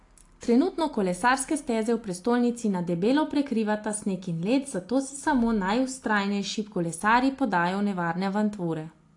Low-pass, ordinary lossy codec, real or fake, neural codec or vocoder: 10.8 kHz; AAC, 48 kbps; real; none